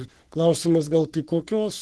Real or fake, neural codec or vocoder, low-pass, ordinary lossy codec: fake; codec, 44.1 kHz, 3.4 kbps, Pupu-Codec; 10.8 kHz; Opus, 16 kbps